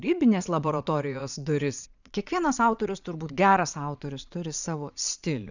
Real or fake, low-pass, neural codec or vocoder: fake; 7.2 kHz; vocoder, 22.05 kHz, 80 mel bands, Vocos